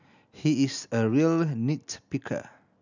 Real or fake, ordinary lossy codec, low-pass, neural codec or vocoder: real; none; 7.2 kHz; none